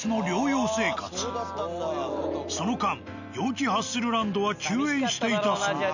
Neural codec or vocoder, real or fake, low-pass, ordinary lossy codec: none; real; 7.2 kHz; none